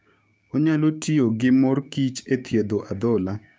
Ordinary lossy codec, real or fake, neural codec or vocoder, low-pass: none; fake; codec, 16 kHz, 6 kbps, DAC; none